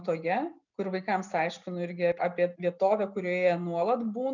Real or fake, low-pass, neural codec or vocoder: real; 7.2 kHz; none